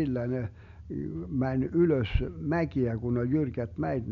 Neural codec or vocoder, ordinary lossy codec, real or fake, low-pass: none; none; real; 7.2 kHz